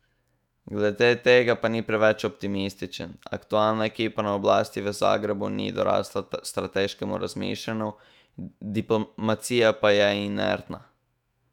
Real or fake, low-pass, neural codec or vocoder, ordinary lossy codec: fake; 19.8 kHz; autoencoder, 48 kHz, 128 numbers a frame, DAC-VAE, trained on Japanese speech; none